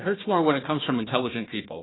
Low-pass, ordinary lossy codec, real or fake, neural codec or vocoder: 7.2 kHz; AAC, 16 kbps; fake; codec, 16 kHz, 1 kbps, FunCodec, trained on Chinese and English, 50 frames a second